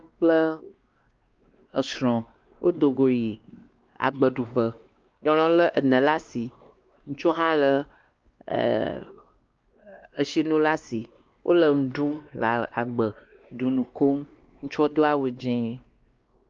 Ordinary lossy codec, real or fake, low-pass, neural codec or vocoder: Opus, 24 kbps; fake; 7.2 kHz; codec, 16 kHz, 2 kbps, X-Codec, WavLM features, trained on Multilingual LibriSpeech